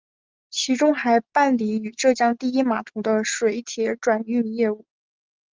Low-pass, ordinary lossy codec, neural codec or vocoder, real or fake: 7.2 kHz; Opus, 24 kbps; none; real